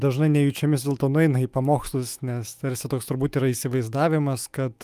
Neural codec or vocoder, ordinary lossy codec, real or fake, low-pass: autoencoder, 48 kHz, 128 numbers a frame, DAC-VAE, trained on Japanese speech; Opus, 32 kbps; fake; 14.4 kHz